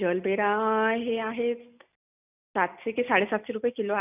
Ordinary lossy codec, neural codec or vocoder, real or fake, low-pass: none; none; real; 3.6 kHz